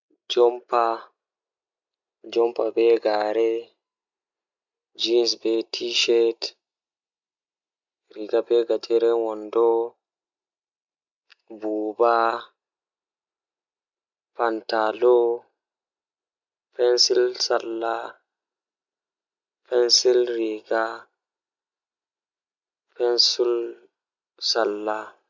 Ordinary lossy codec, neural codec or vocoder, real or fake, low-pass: none; none; real; 7.2 kHz